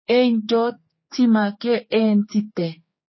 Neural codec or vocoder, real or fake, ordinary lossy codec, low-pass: codec, 16 kHz, 4 kbps, X-Codec, HuBERT features, trained on general audio; fake; MP3, 24 kbps; 7.2 kHz